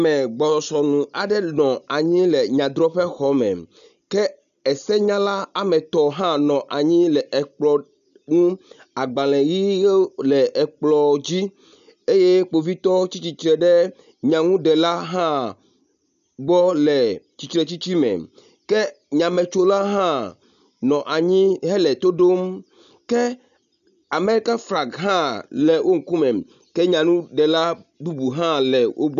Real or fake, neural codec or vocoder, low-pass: real; none; 7.2 kHz